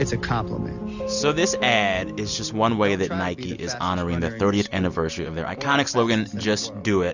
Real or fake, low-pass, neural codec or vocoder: real; 7.2 kHz; none